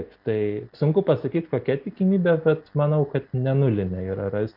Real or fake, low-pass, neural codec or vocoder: real; 5.4 kHz; none